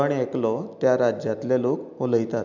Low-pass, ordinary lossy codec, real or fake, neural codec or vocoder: 7.2 kHz; none; real; none